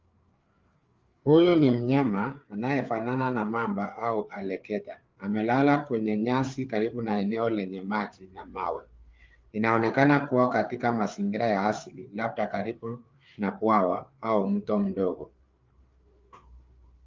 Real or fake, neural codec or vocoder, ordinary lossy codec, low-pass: fake; codec, 16 kHz, 4 kbps, FreqCodec, larger model; Opus, 32 kbps; 7.2 kHz